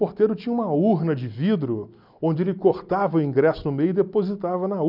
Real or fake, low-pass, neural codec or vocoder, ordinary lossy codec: real; 5.4 kHz; none; none